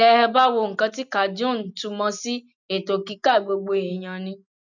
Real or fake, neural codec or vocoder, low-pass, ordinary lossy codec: real; none; 7.2 kHz; none